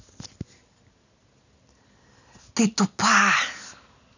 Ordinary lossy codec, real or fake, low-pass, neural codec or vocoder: none; real; 7.2 kHz; none